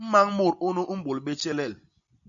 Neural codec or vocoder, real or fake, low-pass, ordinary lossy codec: none; real; 7.2 kHz; AAC, 64 kbps